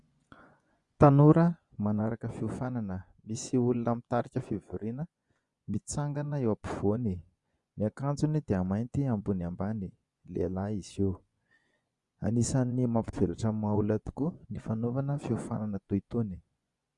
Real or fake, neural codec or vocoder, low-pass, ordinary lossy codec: fake; vocoder, 24 kHz, 100 mel bands, Vocos; 10.8 kHz; Opus, 64 kbps